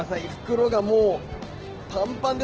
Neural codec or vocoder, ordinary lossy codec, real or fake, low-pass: none; Opus, 16 kbps; real; 7.2 kHz